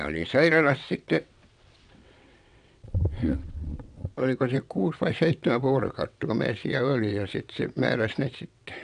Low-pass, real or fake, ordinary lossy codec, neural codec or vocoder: 9.9 kHz; fake; none; vocoder, 22.05 kHz, 80 mel bands, WaveNeXt